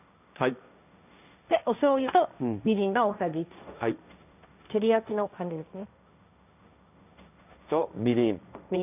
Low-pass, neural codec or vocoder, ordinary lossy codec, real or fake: 3.6 kHz; codec, 16 kHz, 1.1 kbps, Voila-Tokenizer; none; fake